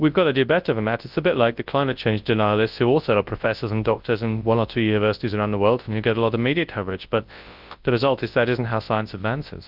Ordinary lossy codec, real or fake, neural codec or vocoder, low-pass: Opus, 24 kbps; fake; codec, 24 kHz, 0.9 kbps, WavTokenizer, large speech release; 5.4 kHz